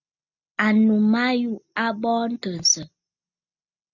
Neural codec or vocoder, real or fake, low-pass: none; real; 7.2 kHz